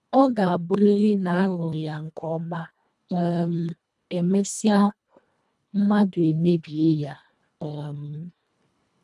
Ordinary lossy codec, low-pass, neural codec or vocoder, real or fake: none; none; codec, 24 kHz, 1.5 kbps, HILCodec; fake